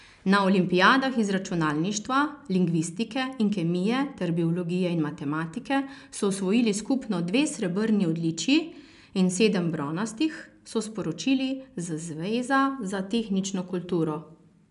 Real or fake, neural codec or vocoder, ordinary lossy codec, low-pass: real; none; none; 10.8 kHz